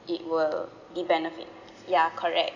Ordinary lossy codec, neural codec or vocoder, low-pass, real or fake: none; none; 7.2 kHz; real